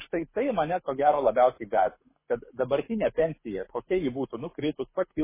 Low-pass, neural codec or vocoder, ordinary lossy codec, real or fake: 3.6 kHz; codec, 24 kHz, 6 kbps, HILCodec; MP3, 16 kbps; fake